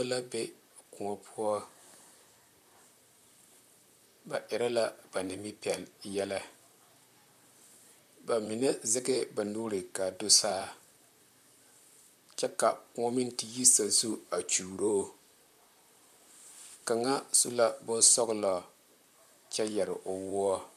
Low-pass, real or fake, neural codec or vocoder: 14.4 kHz; fake; vocoder, 44.1 kHz, 128 mel bands, Pupu-Vocoder